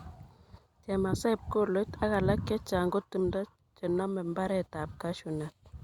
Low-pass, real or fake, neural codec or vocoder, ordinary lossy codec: 19.8 kHz; real; none; Opus, 64 kbps